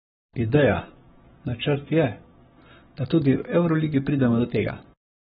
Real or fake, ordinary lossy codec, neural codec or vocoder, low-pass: fake; AAC, 16 kbps; codec, 44.1 kHz, 7.8 kbps, Pupu-Codec; 19.8 kHz